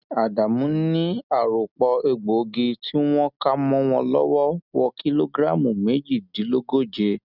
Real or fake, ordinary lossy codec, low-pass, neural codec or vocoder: real; none; 5.4 kHz; none